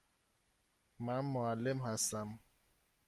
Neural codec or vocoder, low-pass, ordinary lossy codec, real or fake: none; 14.4 kHz; Opus, 32 kbps; real